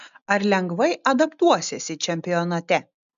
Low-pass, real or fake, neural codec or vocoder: 7.2 kHz; real; none